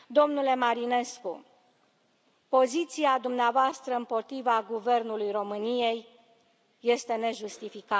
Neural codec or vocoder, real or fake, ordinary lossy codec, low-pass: none; real; none; none